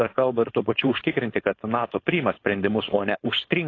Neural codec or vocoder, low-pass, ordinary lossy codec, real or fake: codec, 16 kHz, 4.8 kbps, FACodec; 7.2 kHz; AAC, 32 kbps; fake